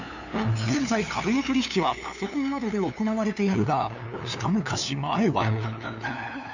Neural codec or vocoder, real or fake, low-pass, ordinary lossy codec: codec, 16 kHz, 2 kbps, FunCodec, trained on LibriTTS, 25 frames a second; fake; 7.2 kHz; none